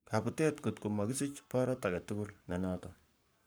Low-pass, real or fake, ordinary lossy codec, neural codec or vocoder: none; fake; none; codec, 44.1 kHz, 7.8 kbps, Pupu-Codec